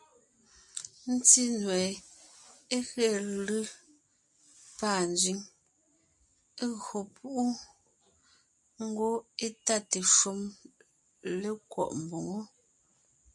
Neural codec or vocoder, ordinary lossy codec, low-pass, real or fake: none; MP3, 64 kbps; 10.8 kHz; real